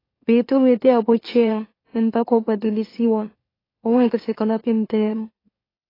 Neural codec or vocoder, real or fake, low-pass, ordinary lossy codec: autoencoder, 44.1 kHz, a latent of 192 numbers a frame, MeloTTS; fake; 5.4 kHz; AAC, 24 kbps